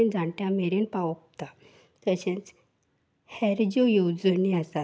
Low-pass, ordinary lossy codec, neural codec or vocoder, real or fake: none; none; none; real